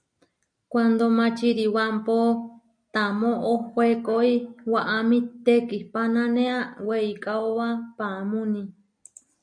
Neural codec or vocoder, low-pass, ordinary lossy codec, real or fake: none; 9.9 kHz; MP3, 48 kbps; real